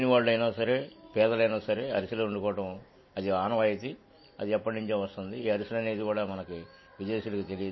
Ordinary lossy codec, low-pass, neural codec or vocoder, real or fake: MP3, 24 kbps; 7.2 kHz; none; real